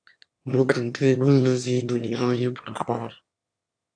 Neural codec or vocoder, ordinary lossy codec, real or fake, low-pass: autoencoder, 22.05 kHz, a latent of 192 numbers a frame, VITS, trained on one speaker; AAC, 64 kbps; fake; 9.9 kHz